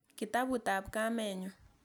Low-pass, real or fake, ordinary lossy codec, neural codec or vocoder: none; real; none; none